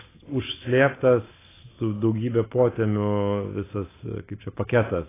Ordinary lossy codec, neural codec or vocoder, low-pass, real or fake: AAC, 16 kbps; none; 3.6 kHz; real